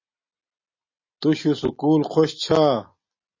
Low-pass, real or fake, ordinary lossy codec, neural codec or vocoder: 7.2 kHz; real; MP3, 32 kbps; none